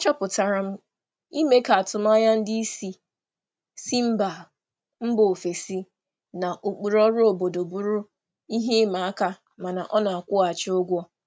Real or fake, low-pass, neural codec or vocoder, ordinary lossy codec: real; none; none; none